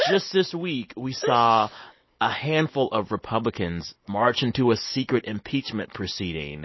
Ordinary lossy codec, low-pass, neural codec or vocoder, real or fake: MP3, 24 kbps; 7.2 kHz; none; real